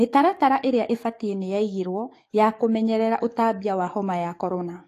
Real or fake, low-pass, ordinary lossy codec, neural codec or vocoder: fake; 14.4 kHz; AAC, 48 kbps; codec, 44.1 kHz, 7.8 kbps, DAC